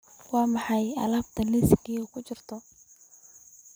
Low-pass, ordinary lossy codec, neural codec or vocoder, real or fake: none; none; vocoder, 44.1 kHz, 128 mel bands every 256 samples, BigVGAN v2; fake